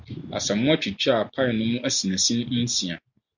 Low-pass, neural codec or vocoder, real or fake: 7.2 kHz; none; real